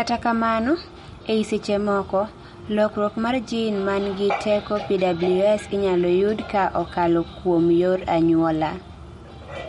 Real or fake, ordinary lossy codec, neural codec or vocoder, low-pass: real; MP3, 48 kbps; none; 19.8 kHz